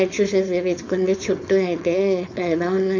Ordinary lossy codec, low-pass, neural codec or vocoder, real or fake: none; 7.2 kHz; codec, 16 kHz, 4.8 kbps, FACodec; fake